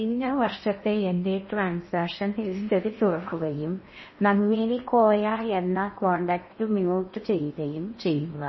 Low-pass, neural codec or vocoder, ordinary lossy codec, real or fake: 7.2 kHz; codec, 16 kHz in and 24 kHz out, 0.8 kbps, FocalCodec, streaming, 65536 codes; MP3, 24 kbps; fake